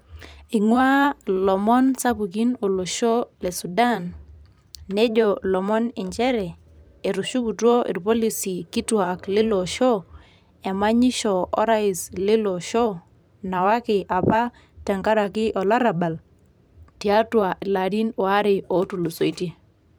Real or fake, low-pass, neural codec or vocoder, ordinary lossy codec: fake; none; vocoder, 44.1 kHz, 128 mel bands every 512 samples, BigVGAN v2; none